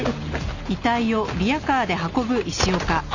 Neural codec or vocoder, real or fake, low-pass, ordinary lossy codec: none; real; 7.2 kHz; none